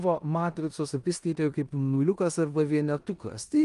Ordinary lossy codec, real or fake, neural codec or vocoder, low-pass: Opus, 32 kbps; fake; codec, 16 kHz in and 24 kHz out, 0.9 kbps, LongCat-Audio-Codec, four codebook decoder; 10.8 kHz